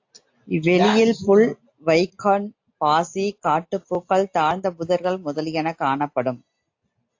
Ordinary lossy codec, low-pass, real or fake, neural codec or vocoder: AAC, 48 kbps; 7.2 kHz; real; none